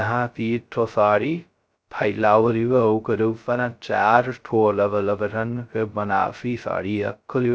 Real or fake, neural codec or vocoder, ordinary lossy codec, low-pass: fake; codec, 16 kHz, 0.2 kbps, FocalCodec; none; none